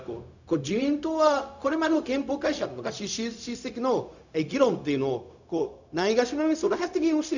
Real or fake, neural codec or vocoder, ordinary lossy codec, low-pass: fake; codec, 16 kHz, 0.4 kbps, LongCat-Audio-Codec; none; 7.2 kHz